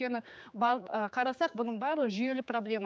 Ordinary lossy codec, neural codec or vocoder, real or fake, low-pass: none; codec, 16 kHz, 4 kbps, X-Codec, HuBERT features, trained on general audio; fake; none